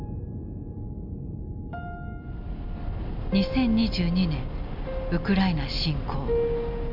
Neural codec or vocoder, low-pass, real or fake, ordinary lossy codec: none; 5.4 kHz; real; AAC, 48 kbps